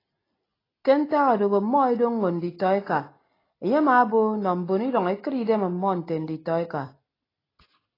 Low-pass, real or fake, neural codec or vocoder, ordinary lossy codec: 5.4 kHz; real; none; AAC, 24 kbps